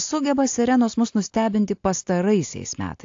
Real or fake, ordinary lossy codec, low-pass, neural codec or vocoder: real; AAC, 48 kbps; 7.2 kHz; none